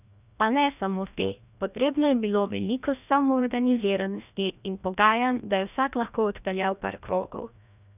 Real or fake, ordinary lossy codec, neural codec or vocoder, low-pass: fake; none; codec, 16 kHz, 1 kbps, FreqCodec, larger model; 3.6 kHz